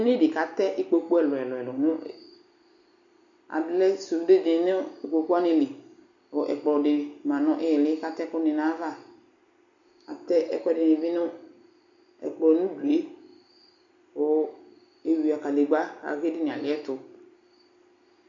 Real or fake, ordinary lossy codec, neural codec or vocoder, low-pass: real; MP3, 96 kbps; none; 7.2 kHz